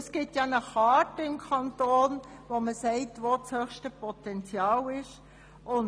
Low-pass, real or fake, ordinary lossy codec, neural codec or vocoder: none; real; none; none